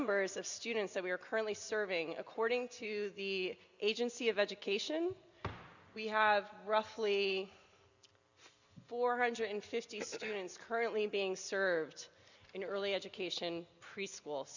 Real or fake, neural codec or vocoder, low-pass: real; none; 7.2 kHz